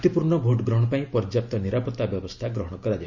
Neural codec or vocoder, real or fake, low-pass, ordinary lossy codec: none; real; 7.2 kHz; Opus, 64 kbps